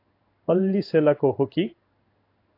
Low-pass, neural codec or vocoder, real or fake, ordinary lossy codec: 5.4 kHz; codec, 16 kHz in and 24 kHz out, 1 kbps, XY-Tokenizer; fake; AAC, 32 kbps